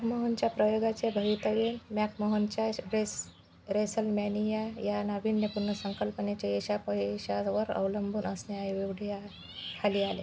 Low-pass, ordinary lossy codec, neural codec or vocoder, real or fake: none; none; none; real